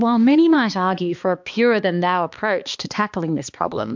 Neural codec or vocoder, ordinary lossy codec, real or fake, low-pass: codec, 16 kHz, 2 kbps, X-Codec, HuBERT features, trained on balanced general audio; MP3, 64 kbps; fake; 7.2 kHz